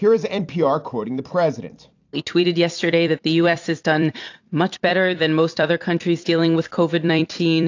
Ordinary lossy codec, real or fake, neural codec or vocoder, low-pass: AAC, 48 kbps; fake; vocoder, 44.1 kHz, 128 mel bands every 256 samples, BigVGAN v2; 7.2 kHz